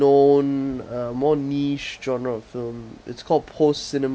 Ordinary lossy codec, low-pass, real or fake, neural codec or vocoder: none; none; real; none